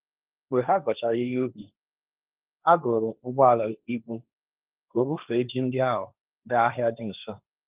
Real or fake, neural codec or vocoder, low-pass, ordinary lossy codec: fake; codec, 16 kHz, 1.1 kbps, Voila-Tokenizer; 3.6 kHz; Opus, 24 kbps